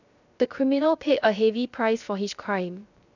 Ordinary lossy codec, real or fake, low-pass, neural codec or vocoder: none; fake; 7.2 kHz; codec, 16 kHz, 0.3 kbps, FocalCodec